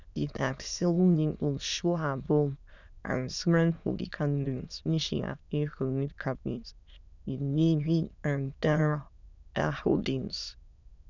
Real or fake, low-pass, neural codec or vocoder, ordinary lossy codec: fake; 7.2 kHz; autoencoder, 22.05 kHz, a latent of 192 numbers a frame, VITS, trained on many speakers; none